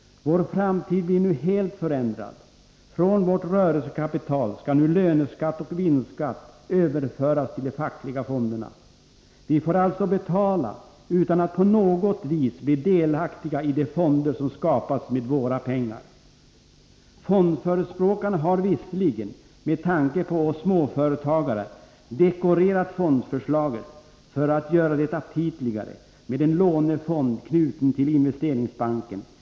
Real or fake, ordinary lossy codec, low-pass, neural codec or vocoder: real; none; none; none